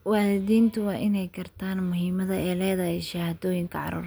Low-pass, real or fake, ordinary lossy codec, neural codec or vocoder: none; real; none; none